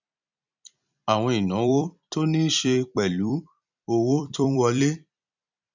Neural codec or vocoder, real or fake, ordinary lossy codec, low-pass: vocoder, 44.1 kHz, 128 mel bands every 256 samples, BigVGAN v2; fake; none; 7.2 kHz